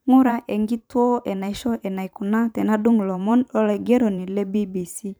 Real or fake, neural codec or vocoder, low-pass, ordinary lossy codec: fake; vocoder, 44.1 kHz, 128 mel bands every 512 samples, BigVGAN v2; none; none